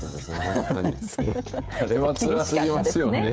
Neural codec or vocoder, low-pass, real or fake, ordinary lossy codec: codec, 16 kHz, 16 kbps, FreqCodec, smaller model; none; fake; none